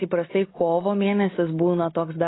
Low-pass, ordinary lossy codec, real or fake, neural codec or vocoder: 7.2 kHz; AAC, 16 kbps; real; none